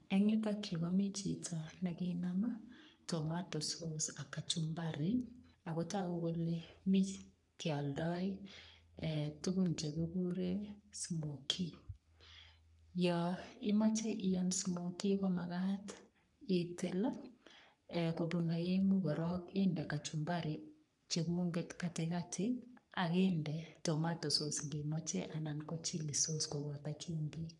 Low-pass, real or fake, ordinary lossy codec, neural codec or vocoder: 10.8 kHz; fake; none; codec, 44.1 kHz, 3.4 kbps, Pupu-Codec